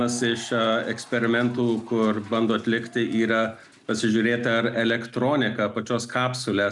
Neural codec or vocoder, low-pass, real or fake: none; 10.8 kHz; real